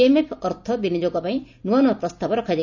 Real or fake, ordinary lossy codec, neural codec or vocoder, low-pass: real; none; none; 7.2 kHz